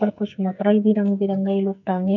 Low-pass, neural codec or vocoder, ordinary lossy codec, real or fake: 7.2 kHz; codec, 44.1 kHz, 2.6 kbps, SNAC; none; fake